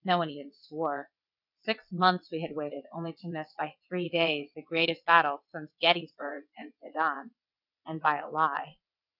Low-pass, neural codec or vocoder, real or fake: 5.4 kHz; vocoder, 22.05 kHz, 80 mel bands, WaveNeXt; fake